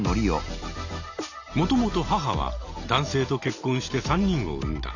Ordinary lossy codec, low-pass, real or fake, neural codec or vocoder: none; 7.2 kHz; real; none